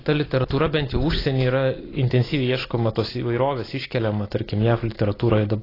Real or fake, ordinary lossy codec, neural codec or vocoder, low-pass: real; AAC, 24 kbps; none; 5.4 kHz